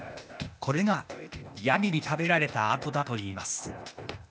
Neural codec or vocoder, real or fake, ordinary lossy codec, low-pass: codec, 16 kHz, 0.8 kbps, ZipCodec; fake; none; none